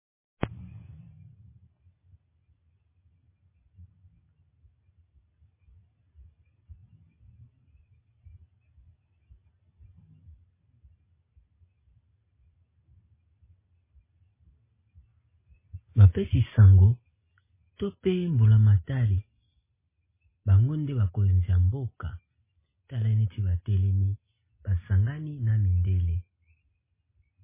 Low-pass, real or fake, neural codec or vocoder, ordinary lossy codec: 3.6 kHz; real; none; MP3, 16 kbps